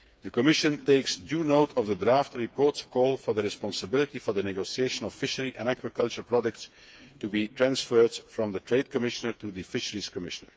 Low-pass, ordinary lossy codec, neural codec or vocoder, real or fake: none; none; codec, 16 kHz, 4 kbps, FreqCodec, smaller model; fake